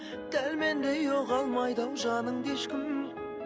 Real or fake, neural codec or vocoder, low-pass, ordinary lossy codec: real; none; none; none